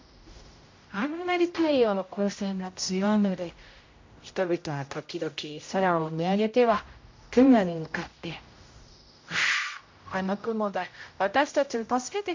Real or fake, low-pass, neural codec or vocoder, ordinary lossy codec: fake; 7.2 kHz; codec, 16 kHz, 0.5 kbps, X-Codec, HuBERT features, trained on general audio; MP3, 48 kbps